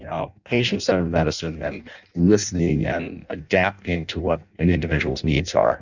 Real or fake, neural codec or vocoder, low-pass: fake; codec, 16 kHz in and 24 kHz out, 0.6 kbps, FireRedTTS-2 codec; 7.2 kHz